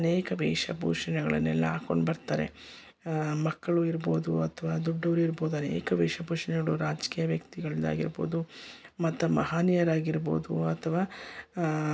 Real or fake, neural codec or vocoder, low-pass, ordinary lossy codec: real; none; none; none